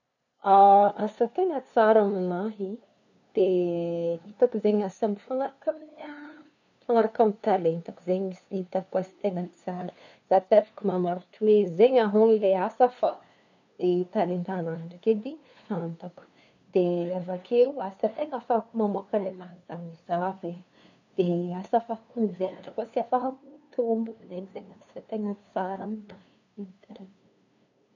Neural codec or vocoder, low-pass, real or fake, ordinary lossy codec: codec, 16 kHz, 2 kbps, FunCodec, trained on LibriTTS, 25 frames a second; 7.2 kHz; fake; none